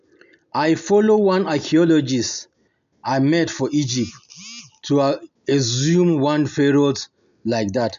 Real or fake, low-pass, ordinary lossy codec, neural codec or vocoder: real; 7.2 kHz; AAC, 96 kbps; none